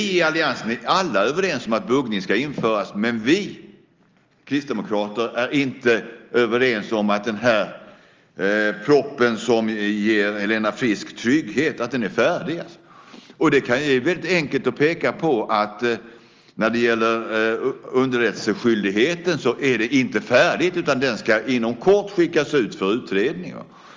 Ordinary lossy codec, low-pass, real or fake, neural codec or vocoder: Opus, 32 kbps; 7.2 kHz; real; none